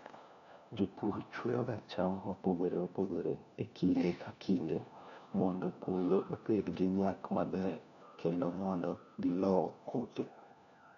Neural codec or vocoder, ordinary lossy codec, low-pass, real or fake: codec, 16 kHz, 1 kbps, FunCodec, trained on LibriTTS, 50 frames a second; none; 7.2 kHz; fake